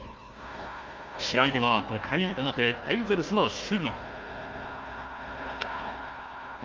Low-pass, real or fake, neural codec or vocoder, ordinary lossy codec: 7.2 kHz; fake; codec, 16 kHz, 1 kbps, FunCodec, trained on Chinese and English, 50 frames a second; Opus, 32 kbps